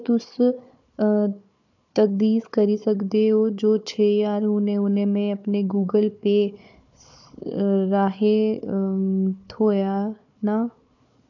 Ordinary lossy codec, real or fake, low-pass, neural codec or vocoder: none; fake; 7.2 kHz; codec, 16 kHz, 4 kbps, FunCodec, trained on Chinese and English, 50 frames a second